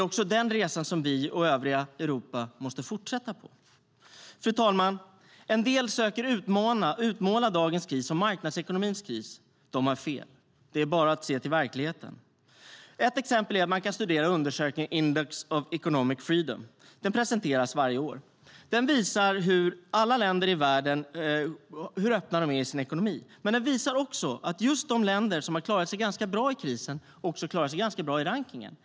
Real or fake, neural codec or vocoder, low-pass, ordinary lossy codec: real; none; none; none